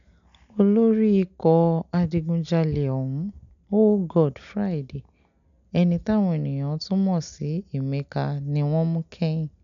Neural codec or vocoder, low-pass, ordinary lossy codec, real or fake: none; 7.2 kHz; none; real